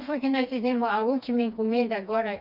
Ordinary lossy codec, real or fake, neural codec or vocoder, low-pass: none; fake; codec, 16 kHz, 2 kbps, FreqCodec, smaller model; 5.4 kHz